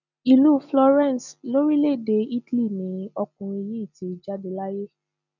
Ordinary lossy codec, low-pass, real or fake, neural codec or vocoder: none; 7.2 kHz; real; none